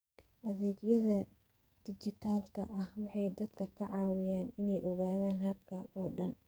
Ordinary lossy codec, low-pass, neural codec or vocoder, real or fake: none; none; codec, 44.1 kHz, 2.6 kbps, SNAC; fake